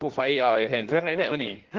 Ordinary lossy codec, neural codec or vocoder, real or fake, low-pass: Opus, 16 kbps; codec, 16 kHz in and 24 kHz out, 1.1 kbps, FireRedTTS-2 codec; fake; 7.2 kHz